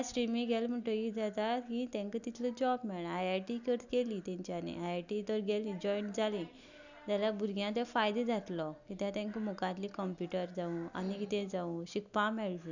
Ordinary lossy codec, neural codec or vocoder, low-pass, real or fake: none; none; 7.2 kHz; real